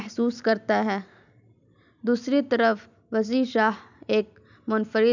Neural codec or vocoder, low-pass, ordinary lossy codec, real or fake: none; 7.2 kHz; none; real